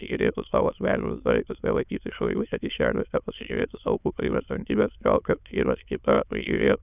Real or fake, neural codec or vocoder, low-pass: fake; autoencoder, 22.05 kHz, a latent of 192 numbers a frame, VITS, trained on many speakers; 3.6 kHz